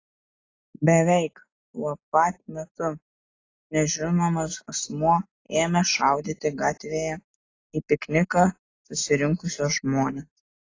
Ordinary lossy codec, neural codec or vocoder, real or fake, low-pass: AAC, 32 kbps; none; real; 7.2 kHz